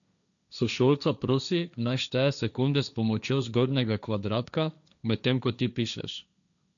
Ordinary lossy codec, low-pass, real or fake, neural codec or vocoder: none; 7.2 kHz; fake; codec, 16 kHz, 1.1 kbps, Voila-Tokenizer